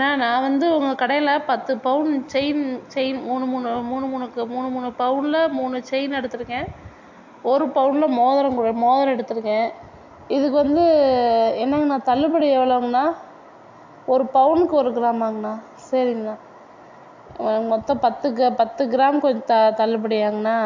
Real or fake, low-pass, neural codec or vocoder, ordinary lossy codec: real; 7.2 kHz; none; MP3, 48 kbps